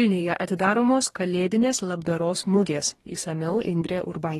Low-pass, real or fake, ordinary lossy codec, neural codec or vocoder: 19.8 kHz; fake; AAC, 32 kbps; codec, 44.1 kHz, 2.6 kbps, DAC